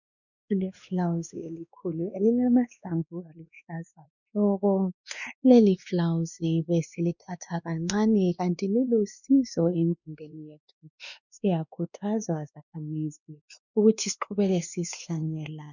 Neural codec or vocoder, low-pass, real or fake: codec, 16 kHz, 2 kbps, X-Codec, WavLM features, trained on Multilingual LibriSpeech; 7.2 kHz; fake